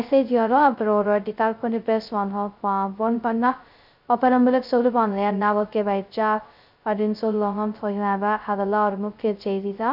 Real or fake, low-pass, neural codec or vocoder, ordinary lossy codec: fake; 5.4 kHz; codec, 16 kHz, 0.2 kbps, FocalCodec; none